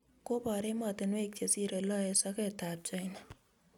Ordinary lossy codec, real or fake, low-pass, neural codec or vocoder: none; real; 19.8 kHz; none